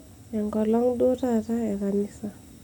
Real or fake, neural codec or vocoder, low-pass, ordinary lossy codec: real; none; none; none